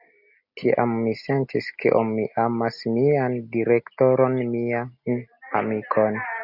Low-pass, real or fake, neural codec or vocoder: 5.4 kHz; real; none